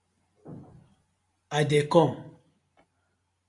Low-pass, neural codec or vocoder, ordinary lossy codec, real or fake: 10.8 kHz; none; AAC, 48 kbps; real